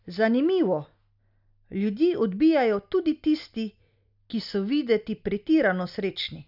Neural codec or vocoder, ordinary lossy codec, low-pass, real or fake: none; none; 5.4 kHz; real